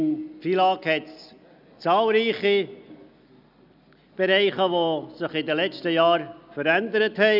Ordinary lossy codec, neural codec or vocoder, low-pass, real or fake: none; none; 5.4 kHz; real